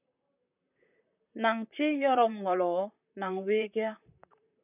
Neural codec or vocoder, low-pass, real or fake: vocoder, 44.1 kHz, 128 mel bands, Pupu-Vocoder; 3.6 kHz; fake